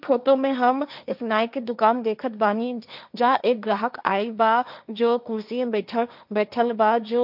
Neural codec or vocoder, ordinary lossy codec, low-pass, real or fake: codec, 16 kHz, 1.1 kbps, Voila-Tokenizer; none; 5.4 kHz; fake